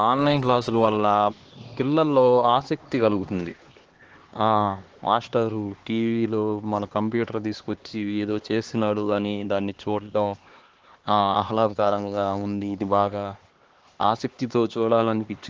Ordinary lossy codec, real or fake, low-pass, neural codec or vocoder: Opus, 16 kbps; fake; 7.2 kHz; codec, 16 kHz, 2 kbps, X-Codec, HuBERT features, trained on LibriSpeech